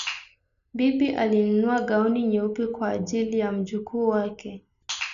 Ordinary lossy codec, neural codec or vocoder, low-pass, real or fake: none; none; 7.2 kHz; real